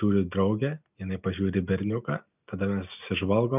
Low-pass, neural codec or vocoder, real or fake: 3.6 kHz; none; real